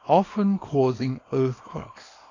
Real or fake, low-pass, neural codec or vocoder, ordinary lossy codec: fake; 7.2 kHz; codec, 24 kHz, 0.9 kbps, WavTokenizer, small release; AAC, 32 kbps